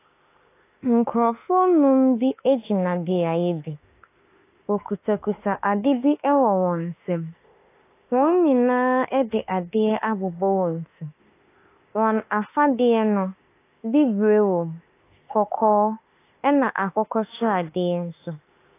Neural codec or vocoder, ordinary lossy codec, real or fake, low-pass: autoencoder, 48 kHz, 32 numbers a frame, DAC-VAE, trained on Japanese speech; AAC, 24 kbps; fake; 3.6 kHz